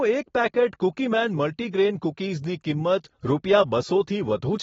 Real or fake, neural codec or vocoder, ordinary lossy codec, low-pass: real; none; AAC, 24 kbps; 7.2 kHz